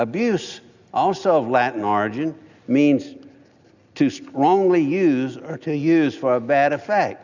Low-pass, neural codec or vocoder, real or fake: 7.2 kHz; none; real